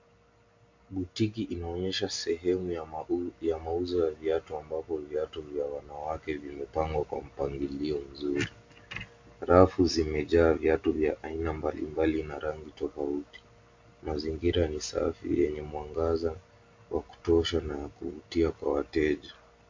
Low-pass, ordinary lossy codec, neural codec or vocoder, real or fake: 7.2 kHz; MP3, 48 kbps; none; real